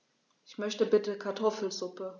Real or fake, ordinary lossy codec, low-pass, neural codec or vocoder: real; none; 7.2 kHz; none